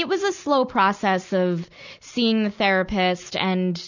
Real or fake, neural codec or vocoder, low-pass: real; none; 7.2 kHz